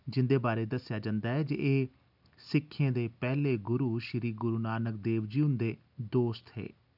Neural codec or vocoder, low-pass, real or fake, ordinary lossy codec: none; 5.4 kHz; real; none